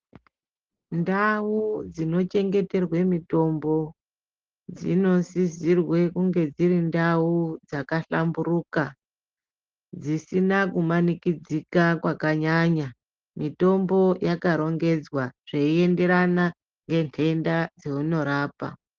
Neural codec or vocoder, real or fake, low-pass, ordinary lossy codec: none; real; 7.2 kHz; Opus, 16 kbps